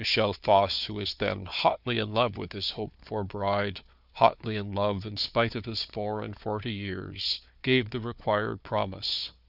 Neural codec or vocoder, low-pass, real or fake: codec, 16 kHz, 4 kbps, FunCodec, trained on Chinese and English, 50 frames a second; 5.4 kHz; fake